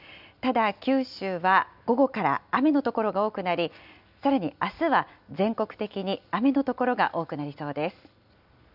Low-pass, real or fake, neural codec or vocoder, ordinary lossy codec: 5.4 kHz; real; none; none